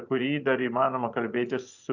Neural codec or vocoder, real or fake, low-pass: vocoder, 24 kHz, 100 mel bands, Vocos; fake; 7.2 kHz